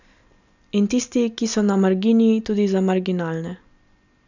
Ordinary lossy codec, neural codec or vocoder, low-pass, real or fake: none; none; 7.2 kHz; real